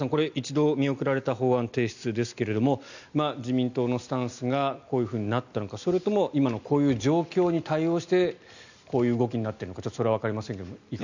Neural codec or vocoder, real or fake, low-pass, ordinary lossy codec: none; real; 7.2 kHz; none